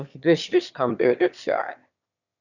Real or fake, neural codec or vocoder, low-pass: fake; autoencoder, 22.05 kHz, a latent of 192 numbers a frame, VITS, trained on one speaker; 7.2 kHz